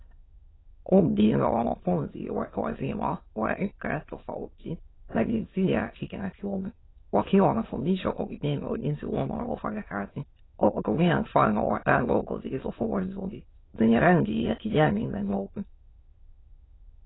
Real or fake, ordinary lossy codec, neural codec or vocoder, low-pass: fake; AAC, 16 kbps; autoencoder, 22.05 kHz, a latent of 192 numbers a frame, VITS, trained on many speakers; 7.2 kHz